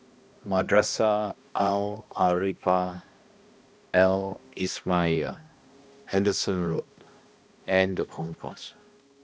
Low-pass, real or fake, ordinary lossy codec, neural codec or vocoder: none; fake; none; codec, 16 kHz, 1 kbps, X-Codec, HuBERT features, trained on balanced general audio